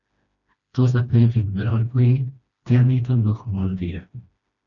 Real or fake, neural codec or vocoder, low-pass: fake; codec, 16 kHz, 1 kbps, FreqCodec, smaller model; 7.2 kHz